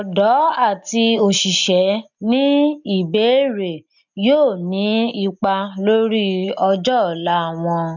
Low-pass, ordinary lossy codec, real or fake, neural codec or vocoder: 7.2 kHz; none; real; none